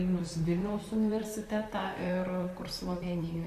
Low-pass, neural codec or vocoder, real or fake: 14.4 kHz; vocoder, 44.1 kHz, 128 mel bands, Pupu-Vocoder; fake